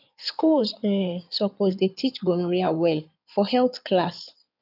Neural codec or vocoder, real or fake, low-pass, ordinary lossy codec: codec, 16 kHz in and 24 kHz out, 2.2 kbps, FireRedTTS-2 codec; fake; 5.4 kHz; none